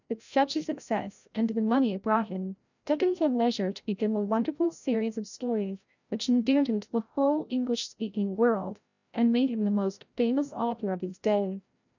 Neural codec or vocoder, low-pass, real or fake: codec, 16 kHz, 0.5 kbps, FreqCodec, larger model; 7.2 kHz; fake